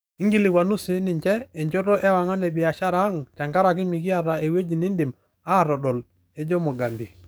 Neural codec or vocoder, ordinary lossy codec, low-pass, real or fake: codec, 44.1 kHz, 7.8 kbps, DAC; none; none; fake